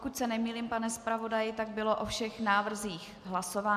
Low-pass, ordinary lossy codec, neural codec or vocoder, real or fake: 14.4 kHz; MP3, 96 kbps; none; real